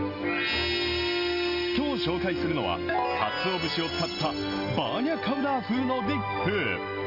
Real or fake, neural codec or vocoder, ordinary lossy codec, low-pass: real; none; Opus, 32 kbps; 5.4 kHz